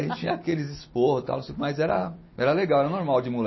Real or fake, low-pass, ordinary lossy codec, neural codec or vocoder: real; 7.2 kHz; MP3, 24 kbps; none